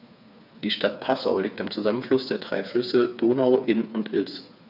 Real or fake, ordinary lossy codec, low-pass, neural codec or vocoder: fake; none; 5.4 kHz; codec, 16 kHz, 4 kbps, FreqCodec, smaller model